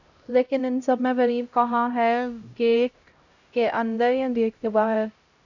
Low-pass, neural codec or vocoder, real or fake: 7.2 kHz; codec, 16 kHz, 0.5 kbps, X-Codec, HuBERT features, trained on LibriSpeech; fake